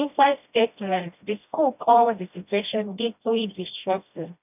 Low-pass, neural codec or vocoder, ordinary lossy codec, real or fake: 3.6 kHz; codec, 16 kHz, 1 kbps, FreqCodec, smaller model; none; fake